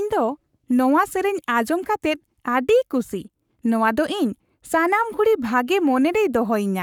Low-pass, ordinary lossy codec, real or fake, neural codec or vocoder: 19.8 kHz; none; fake; codec, 44.1 kHz, 7.8 kbps, Pupu-Codec